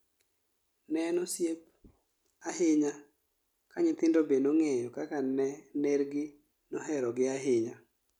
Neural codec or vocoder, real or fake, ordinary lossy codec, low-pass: none; real; none; 19.8 kHz